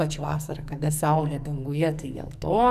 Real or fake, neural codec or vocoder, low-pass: fake; codec, 44.1 kHz, 2.6 kbps, SNAC; 14.4 kHz